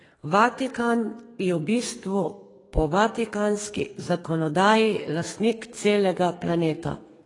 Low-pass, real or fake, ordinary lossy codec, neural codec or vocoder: 10.8 kHz; fake; AAC, 32 kbps; codec, 32 kHz, 1.9 kbps, SNAC